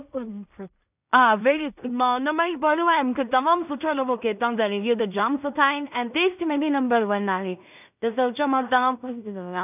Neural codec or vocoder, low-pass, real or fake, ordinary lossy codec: codec, 16 kHz in and 24 kHz out, 0.4 kbps, LongCat-Audio-Codec, two codebook decoder; 3.6 kHz; fake; none